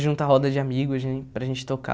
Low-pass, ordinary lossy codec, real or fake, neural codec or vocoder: none; none; real; none